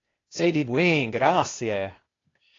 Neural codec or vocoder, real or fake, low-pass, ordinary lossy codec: codec, 16 kHz, 0.8 kbps, ZipCodec; fake; 7.2 kHz; AAC, 32 kbps